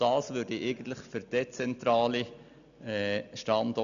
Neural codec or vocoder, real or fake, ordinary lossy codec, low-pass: none; real; none; 7.2 kHz